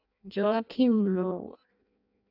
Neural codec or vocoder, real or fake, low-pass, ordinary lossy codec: codec, 16 kHz in and 24 kHz out, 0.6 kbps, FireRedTTS-2 codec; fake; 5.4 kHz; AAC, 48 kbps